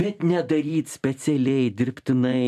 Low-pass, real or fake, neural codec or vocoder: 14.4 kHz; fake; vocoder, 44.1 kHz, 128 mel bands every 256 samples, BigVGAN v2